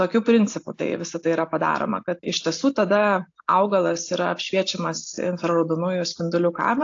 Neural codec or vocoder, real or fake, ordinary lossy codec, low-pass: none; real; AAC, 48 kbps; 7.2 kHz